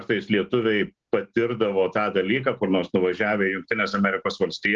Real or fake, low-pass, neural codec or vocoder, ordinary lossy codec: real; 7.2 kHz; none; Opus, 16 kbps